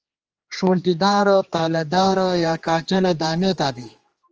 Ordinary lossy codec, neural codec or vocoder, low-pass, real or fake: Opus, 24 kbps; codec, 16 kHz, 2 kbps, X-Codec, HuBERT features, trained on general audio; 7.2 kHz; fake